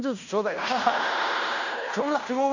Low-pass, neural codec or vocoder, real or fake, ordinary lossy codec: 7.2 kHz; codec, 16 kHz in and 24 kHz out, 0.9 kbps, LongCat-Audio-Codec, fine tuned four codebook decoder; fake; none